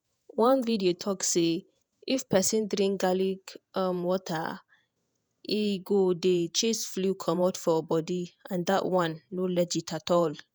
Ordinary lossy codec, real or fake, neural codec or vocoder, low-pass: none; fake; vocoder, 48 kHz, 128 mel bands, Vocos; none